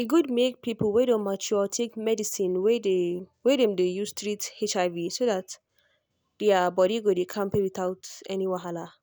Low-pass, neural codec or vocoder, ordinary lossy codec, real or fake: none; none; none; real